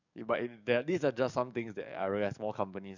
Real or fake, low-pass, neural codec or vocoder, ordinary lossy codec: fake; 7.2 kHz; codec, 44.1 kHz, 7.8 kbps, DAC; none